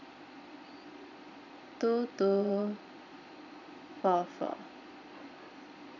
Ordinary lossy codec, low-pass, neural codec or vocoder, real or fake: none; 7.2 kHz; vocoder, 22.05 kHz, 80 mel bands, Vocos; fake